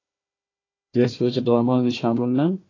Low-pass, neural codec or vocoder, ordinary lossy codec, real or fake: 7.2 kHz; codec, 16 kHz, 1 kbps, FunCodec, trained on Chinese and English, 50 frames a second; AAC, 32 kbps; fake